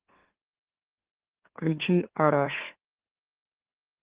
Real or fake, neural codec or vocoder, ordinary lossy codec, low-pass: fake; autoencoder, 44.1 kHz, a latent of 192 numbers a frame, MeloTTS; Opus, 16 kbps; 3.6 kHz